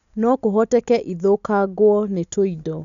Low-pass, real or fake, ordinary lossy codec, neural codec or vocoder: 7.2 kHz; real; none; none